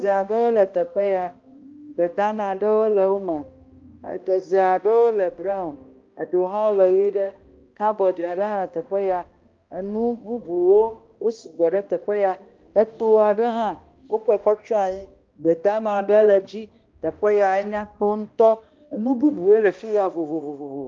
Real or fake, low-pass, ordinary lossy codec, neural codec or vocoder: fake; 7.2 kHz; Opus, 24 kbps; codec, 16 kHz, 1 kbps, X-Codec, HuBERT features, trained on balanced general audio